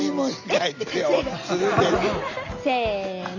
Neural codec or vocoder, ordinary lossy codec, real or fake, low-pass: none; none; real; 7.2 kHz